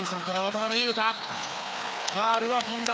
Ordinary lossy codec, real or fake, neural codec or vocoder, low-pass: none; fake; codec, 16 kHz, 2 kbps, FreqCodec, larger model; none